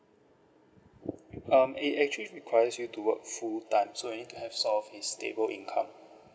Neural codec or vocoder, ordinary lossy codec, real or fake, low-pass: none; none; real; none